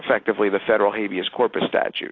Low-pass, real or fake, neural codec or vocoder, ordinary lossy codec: 7.2 kHz; real; none; AAC, 32 kbps